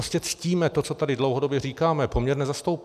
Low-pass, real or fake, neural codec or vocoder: 14.4 kHz; real; none